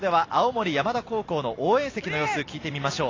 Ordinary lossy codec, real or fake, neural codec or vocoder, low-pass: AAC, 32 kbps; real; none; 7.2 kHz